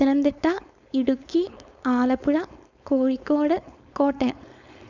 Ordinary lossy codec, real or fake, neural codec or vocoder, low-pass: none; fake; codec, 16 kHz, 4.8 kbps, FACodec; 7.2 kHz